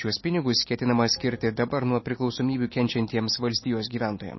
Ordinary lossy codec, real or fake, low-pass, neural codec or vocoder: MP3, 24 kbps; real; 7.2 kHz; none